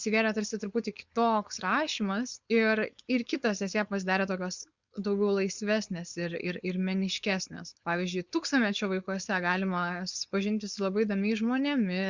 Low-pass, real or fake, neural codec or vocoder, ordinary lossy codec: 7.2 kHz; fake; codec, 16 kHz, 4.8 kbps, FACodec; Opus, 64 kbps